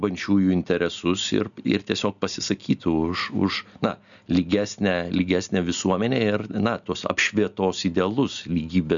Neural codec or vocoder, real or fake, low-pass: none; real; 7.2 kHz